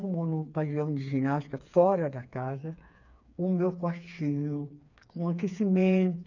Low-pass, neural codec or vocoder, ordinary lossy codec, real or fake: 7.2 kHz; codec, 16 kHz, 4 kbps, FreqCodec, smaller model; none; fake